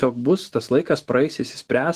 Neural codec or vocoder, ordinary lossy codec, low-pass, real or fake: autoencoder, 48 kHz, 128 numbers a frame, DAC-VAE, trained on Japanese speech; Opus, 16 kbps; 14.4 kHz; fake